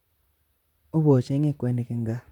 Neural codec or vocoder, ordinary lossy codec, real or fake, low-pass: vocoder, 44.1 kHz, 128 mel bands, Pupu-Vocoder; none; fake; 19.8 kHz